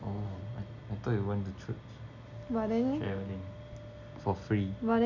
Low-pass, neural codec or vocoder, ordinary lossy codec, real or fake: 7.2 kHz; none; none; real